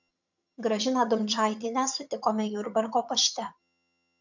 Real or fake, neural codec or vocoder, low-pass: fake; vocoder, 22.05 kHz, 80 mel bands, HiFi-GAN; 7.2 kHz